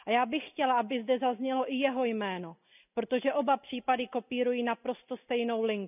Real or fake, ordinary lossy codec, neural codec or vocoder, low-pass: real; none; none; 3.6 kHz